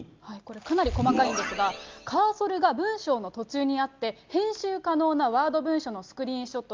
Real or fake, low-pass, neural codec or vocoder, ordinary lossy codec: real; 7.2 kHz; none; Opus, 24 kbps